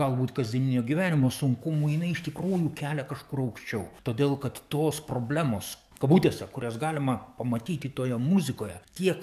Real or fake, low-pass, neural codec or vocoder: fake; 14.4 kHz; codec, 44.1 kHz, 7.8 kbps, DAC